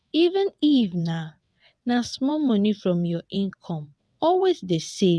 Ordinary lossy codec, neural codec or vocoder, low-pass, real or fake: none; vocoder, 22.05 kHz, 80 mel bands, WaveNeXt; none; fake